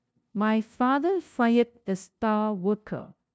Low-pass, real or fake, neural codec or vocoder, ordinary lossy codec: none; fake; codec, 16 kHz, 0.5 kbps, FunCodec, trained on LibriTTS, 25 frames a second; none